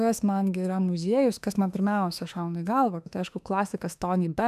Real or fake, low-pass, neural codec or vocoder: fake; 14.4 kHz; autoencoder, 48 kHz, 32 numbers a frame, DAC-VAE, trained on Japanese speech